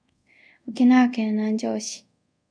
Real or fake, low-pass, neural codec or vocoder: fake; 9.9 kHz; codec, 24 kHz, 0.5 kbps, DualCodec